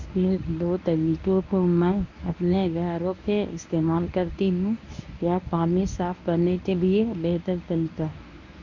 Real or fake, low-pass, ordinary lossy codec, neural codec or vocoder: fake; 7.2 kHz; none; codec, 24 kHz, 0.9 kbps, WavTokenizer, medium speech release version 1